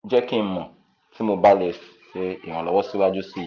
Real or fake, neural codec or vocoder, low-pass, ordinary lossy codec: real; none; 7.2 kHz; none